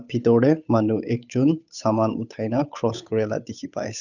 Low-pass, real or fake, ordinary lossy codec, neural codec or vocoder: 7.2 kHz; fake; none; codec, 16 kHz, 8 kbps, FunCodec, trained on Chinese and English, 25 frames a second